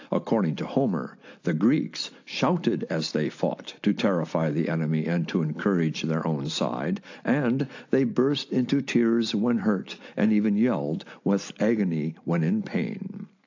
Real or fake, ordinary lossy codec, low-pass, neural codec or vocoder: real; AAC, 48 kbps; 7.2 kHz; none